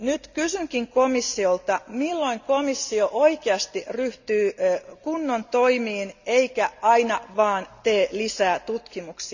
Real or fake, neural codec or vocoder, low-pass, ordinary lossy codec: real; none; 7.2 kHz; none